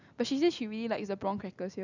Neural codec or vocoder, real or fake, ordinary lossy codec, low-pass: none; real; none; 7.2 kHz